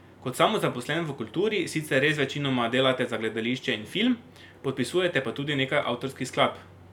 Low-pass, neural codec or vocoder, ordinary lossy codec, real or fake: 19.8 kHz; none; none; real